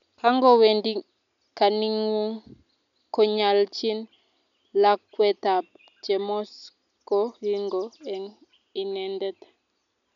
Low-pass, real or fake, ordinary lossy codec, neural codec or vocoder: 7.2 kHz; real; none; none